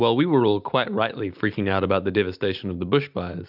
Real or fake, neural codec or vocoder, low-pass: real; none; 5.4 kHz